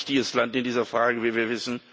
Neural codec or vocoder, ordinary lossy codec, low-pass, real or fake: none; none; none; real